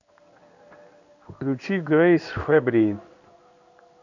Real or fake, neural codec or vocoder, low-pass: fake; codec, 16 kHz in and 24 kHz out, 1 kbps, XY-Tokenizer; 7.2 kHz